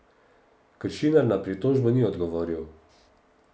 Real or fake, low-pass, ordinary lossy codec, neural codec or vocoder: real; none; none; none